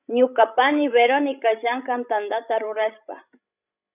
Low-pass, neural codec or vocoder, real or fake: 3.6 kHz; codec, 16 kHz, 16 kbps, FreqCodec, larger model; fake